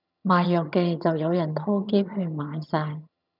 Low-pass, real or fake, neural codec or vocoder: 5.4 kHz; fake; vocoder, 22.05 kHz, 80 mel bands, HiFi-GAN